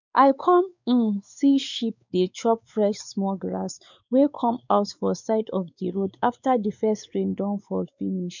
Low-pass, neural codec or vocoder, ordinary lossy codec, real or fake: 7.2 kHz; codec, 16 kHz, 4 kbps, X-Codec, WavLM features, trained on Multilingual LibriSpeech; none; fake